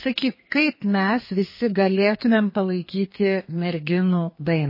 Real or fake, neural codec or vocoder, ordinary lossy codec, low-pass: fake; codec, 32 kHz, 1.9 kbps, SNAC; MP3, 24 kbps; 5.4 kHz